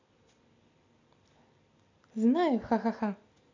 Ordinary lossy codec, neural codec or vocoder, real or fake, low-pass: MP3, 64 kbps; none; real; 7.2 kHz